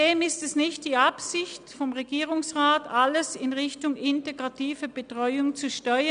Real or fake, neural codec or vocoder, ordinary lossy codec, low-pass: real; none; none; 9.9 kHz